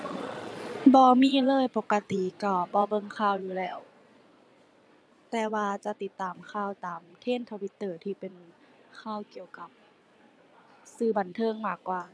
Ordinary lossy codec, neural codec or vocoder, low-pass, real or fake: none; vocoder, 22.05 kHz, 80 mel bands, Vocos; none; fake